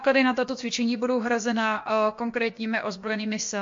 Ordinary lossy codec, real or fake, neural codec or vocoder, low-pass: MP3, 48 kbps; fake; codec, 16 kHz, about 1 kbps, DyCAST, with the encoder's durations; 7.2 kHz